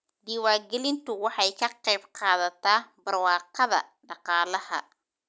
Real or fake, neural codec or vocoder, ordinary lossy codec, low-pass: real; none; none; none